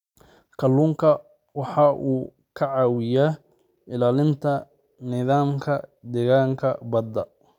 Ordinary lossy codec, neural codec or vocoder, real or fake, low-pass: none; none; real; 19.8 kHz